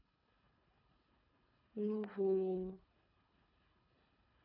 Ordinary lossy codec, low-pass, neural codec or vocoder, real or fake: none; 5.4 kHz; codec, 24 kHz, 3 kbps, HILCodec; fake